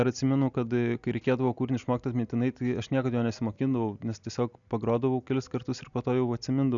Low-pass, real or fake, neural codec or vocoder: 7.2 kHz; real; none